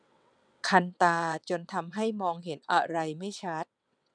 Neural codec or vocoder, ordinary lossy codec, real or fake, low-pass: vocoder, 22.05 kHz, 80 mel bands, WaveNeXt; none; fake; 9.9 kHz